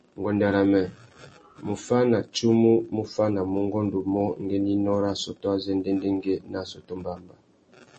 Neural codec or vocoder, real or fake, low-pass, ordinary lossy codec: none; real; 10.8 kHz; MP3, 32 kbps